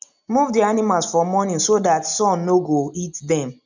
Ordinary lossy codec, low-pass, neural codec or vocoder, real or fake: none; 7.2 kHz; none; real